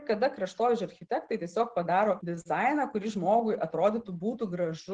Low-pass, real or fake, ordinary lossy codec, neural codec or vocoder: 9.9 kHz; real; Opus, 16 kbps; none